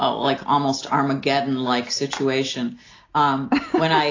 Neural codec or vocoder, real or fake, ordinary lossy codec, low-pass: none; real; AAC, 32 kbps; 7.2 kHz